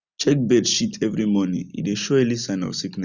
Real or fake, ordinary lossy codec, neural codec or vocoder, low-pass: real; none; none; 7.2 kHz